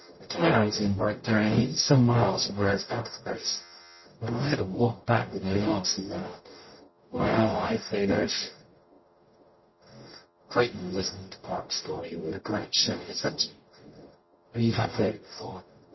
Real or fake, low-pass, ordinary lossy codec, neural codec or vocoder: fake; 7.2 kHz; MP3, 24 kbps; codec, 44.1 kHz, 0.9 kbps, DAC